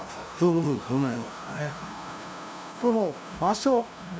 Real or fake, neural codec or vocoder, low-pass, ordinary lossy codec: fake; codec, 16 kHz, 0.5 kbps, FunCodec, trained on LibriTTS, 25 frames a second; none; none